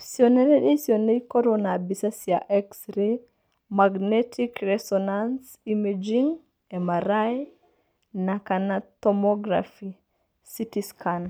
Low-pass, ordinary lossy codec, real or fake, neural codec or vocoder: none; none; real; none